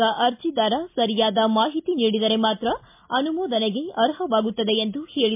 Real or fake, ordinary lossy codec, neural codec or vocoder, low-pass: real; MP3, 32 kbps; none; 3.6 kHz